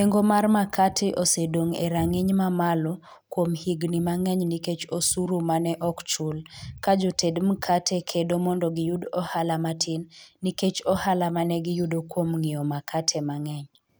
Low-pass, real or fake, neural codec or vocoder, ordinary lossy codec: none; real; none; none